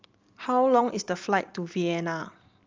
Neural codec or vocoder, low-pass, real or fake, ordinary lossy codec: none; 7.2 kHz; real; Opus, 32 kbps